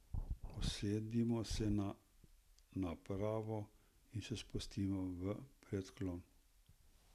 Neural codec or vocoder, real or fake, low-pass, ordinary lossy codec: none; real; 14.4 kHz; none